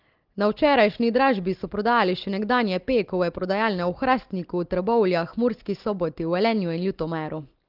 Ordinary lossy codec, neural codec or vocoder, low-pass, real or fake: Opus, 16 kbps; none; 5.4 kHz; real